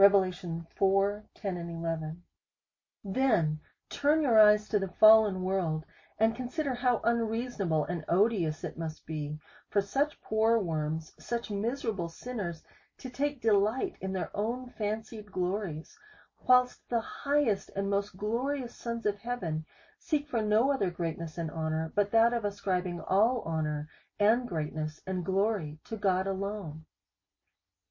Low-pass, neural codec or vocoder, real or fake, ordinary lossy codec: 7.2 kHz; none; real; MP3, 32 kbps